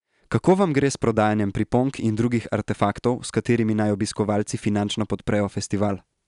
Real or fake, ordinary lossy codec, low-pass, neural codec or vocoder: real; none; 10.8 kHz; none